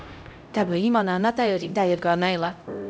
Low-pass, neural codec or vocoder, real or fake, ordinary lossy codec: none; codec, 16 kHz, 0.5 kbps, X-Codec, HuBERT features, trained on LibriSpeech; fake; none